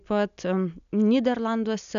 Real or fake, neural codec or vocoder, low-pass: real; none; 7.2 kHz